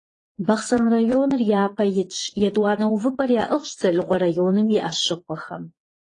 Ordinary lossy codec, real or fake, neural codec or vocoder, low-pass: AAC, 32 kbps; fake; vocoder, 22.05 kHz, 80 mel bands, Vocos; 9.9 kHz